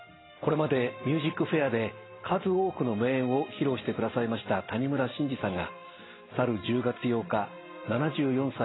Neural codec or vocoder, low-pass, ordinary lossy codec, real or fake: none; 7.2 kHz; AAC, 16 kbps; real